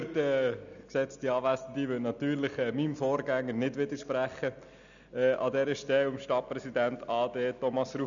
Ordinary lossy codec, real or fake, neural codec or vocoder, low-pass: none; real; none; 7.2 kHz